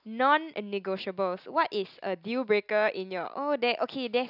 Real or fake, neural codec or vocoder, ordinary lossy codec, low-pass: real; none; none; 5.4 kHz